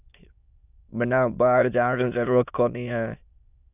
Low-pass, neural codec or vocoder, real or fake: 3.6 kHz; autoencoder, 22.05 kHz, a latent of 192 numbers a frame, VITS, trained on many speakers; fake